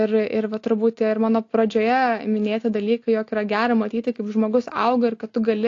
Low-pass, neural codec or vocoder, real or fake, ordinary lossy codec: 7.2 kHz; none; real; AAC, 48 kbps